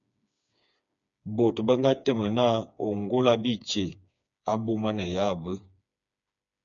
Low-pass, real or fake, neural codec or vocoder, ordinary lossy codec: 7.2 kHz; fake; codec, 16 kHz, 4 kbps, FreqCodec, smaller model; MP3, 96 kbps